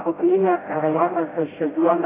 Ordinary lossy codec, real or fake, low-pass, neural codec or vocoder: AAC, 16 kbps; fake; 3.6 kHz; codec, 16 kHz, 0.5 kbps, FreqCodec, smaller model